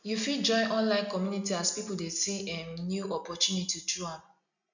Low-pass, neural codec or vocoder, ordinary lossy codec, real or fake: 7.2 kHz; none; none; real